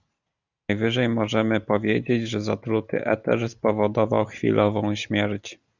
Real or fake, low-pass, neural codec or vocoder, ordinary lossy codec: real; 7.2 kHz; none; Opus, 64 kbps